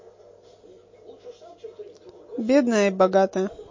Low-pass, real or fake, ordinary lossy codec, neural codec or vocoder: 7.2 kHz; real; MP3, 32 kbps; none